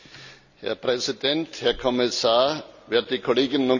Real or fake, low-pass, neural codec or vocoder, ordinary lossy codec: real; 7.2 kHz; none; none